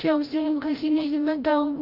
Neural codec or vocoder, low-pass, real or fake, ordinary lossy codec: codec, 16 kHz, 0.5 kbps, FreqCodec, larger model; 5.4 kHz; fake; Opus, 32 kbps